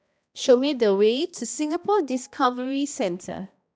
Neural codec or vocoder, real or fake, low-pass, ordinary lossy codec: codec, 16 kHz, 1 kbps, X-Codec, HuBERT features, trained on balanced general audio; fake; none; none